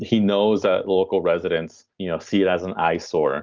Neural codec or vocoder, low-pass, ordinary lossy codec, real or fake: none; 7.2 kHz; Opus, 32 kbps; real